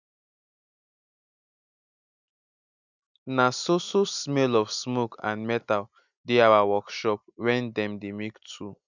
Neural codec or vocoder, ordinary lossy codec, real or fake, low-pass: none; none; real; 7.2 kHz